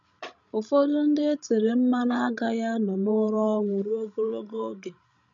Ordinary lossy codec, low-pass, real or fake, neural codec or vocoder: none; 7.2 kHz; fake; codec, 16 kHz, 16 kbps, FreqCodec, larger model